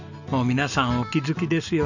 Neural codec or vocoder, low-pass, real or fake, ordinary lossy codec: none; 7.2 kHz; real; none